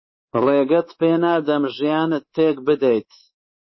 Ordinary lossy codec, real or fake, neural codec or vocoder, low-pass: MP3, 24 kbps; fake; codec, 24 kHz, 3.1 kbps, DualCodec; 7.2 kHz